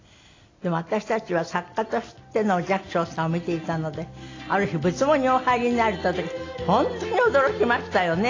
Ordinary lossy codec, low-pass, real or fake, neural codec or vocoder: AAC, 32 kbps; 7.2 kHz; real; none